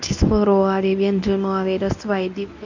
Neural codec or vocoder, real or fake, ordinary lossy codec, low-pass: codec, 24 kHz, 0.9 kbps, WavTokenizer, medium speech release version 1; fake; none; 7.2 kHz